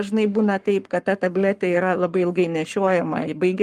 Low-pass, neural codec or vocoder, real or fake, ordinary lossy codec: 14.4 kHz; codec, 44.1 kHz, 7.8 kbps, Pupu-Codec; fake; Opus, 32 kbps